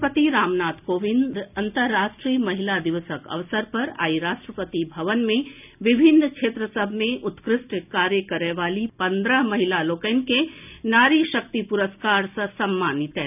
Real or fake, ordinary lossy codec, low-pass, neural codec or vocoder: real; none; 3.6 kHz; none